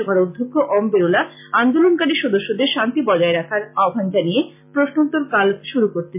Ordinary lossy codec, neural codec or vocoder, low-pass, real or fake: MP3, 32 kbps; none; 3.6 kHz; real